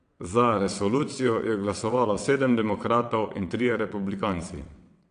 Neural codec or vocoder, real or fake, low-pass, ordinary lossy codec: vocoder, 22.05 kHz, 80 mel bands, WaveNeXt; fake; 9.9 kHz; AAC, 64 kbps